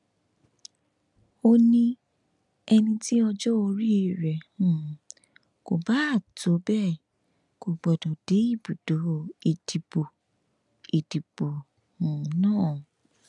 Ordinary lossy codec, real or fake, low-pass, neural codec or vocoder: none; real; 10.8 kHz; none